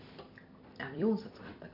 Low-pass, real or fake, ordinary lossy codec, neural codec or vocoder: 5.4 kHz; fake; none; vocoder, 44.1 kHz, 128 mel bands every 256 samples, BigVGAN v2